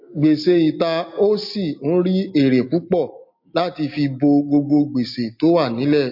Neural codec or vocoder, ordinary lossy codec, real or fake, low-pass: none; MP3, 32 kbps; real; 5.4 kHz